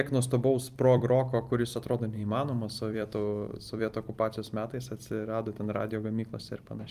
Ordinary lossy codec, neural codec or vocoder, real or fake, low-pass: Opus, 32 kbps; vocoder, 44.1 kHz, 128 mel bands every 256 samples, BigVGAN v2; fake; 14.4 kHz